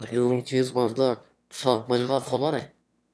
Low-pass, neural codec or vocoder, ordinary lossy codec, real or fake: none; autoencoder, 22.05 kHz, a latent of 192 numbers a frame, VITS, trained on one speaker; none; fake